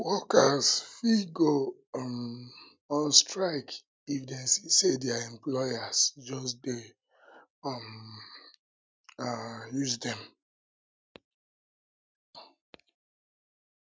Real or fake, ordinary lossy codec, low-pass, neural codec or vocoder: real; none; none; none